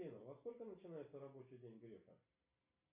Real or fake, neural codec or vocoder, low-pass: real; none; 3.6 kHz